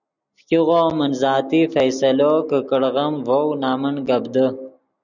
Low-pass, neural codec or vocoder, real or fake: 7.2 kHz; none; real